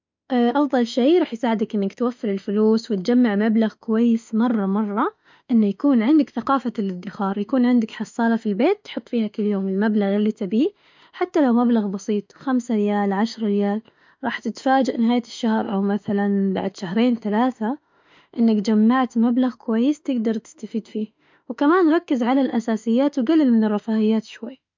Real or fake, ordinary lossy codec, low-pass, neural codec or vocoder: fake; MP3, 64 kbps; 7.2 kHz; autoencoder, 48 kHz, 32 numbers a frame, DAC-VAE, trained on Japanese speech